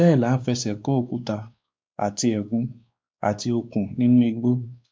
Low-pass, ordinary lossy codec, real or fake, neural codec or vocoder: none; none; fake; codec, 16 kHz, 2 kbps, X-Codec, WavLM features, trained on Multilingual LibriSpeech